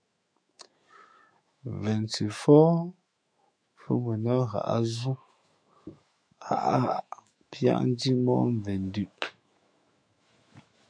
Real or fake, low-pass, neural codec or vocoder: fake; 9.9 kHz; autoencoder, 48 kHz, 128 numbers a frame, DAC-VAE, trained on Japanese speech